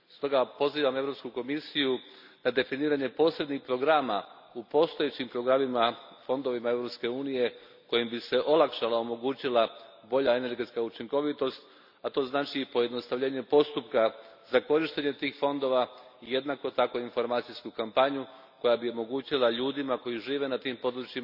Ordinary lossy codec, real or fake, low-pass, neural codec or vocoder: none; real; 5.4 kHz; none